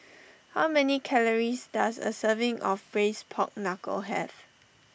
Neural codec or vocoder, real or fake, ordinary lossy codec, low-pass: none; real; none; none